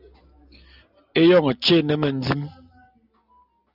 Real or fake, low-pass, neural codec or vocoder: real; 5.4 kHz; none